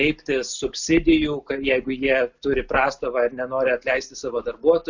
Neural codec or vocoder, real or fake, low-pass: none; real; 7.2 kHz